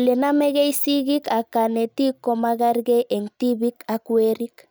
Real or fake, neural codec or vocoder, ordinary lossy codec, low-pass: real; none; none; none